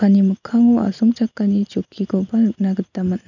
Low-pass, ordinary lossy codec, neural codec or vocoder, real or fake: 7.2 kHz; none; none; real